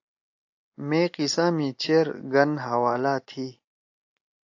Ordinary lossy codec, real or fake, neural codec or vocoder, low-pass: AAC, 48 kbps; real; none; 7.2 kHz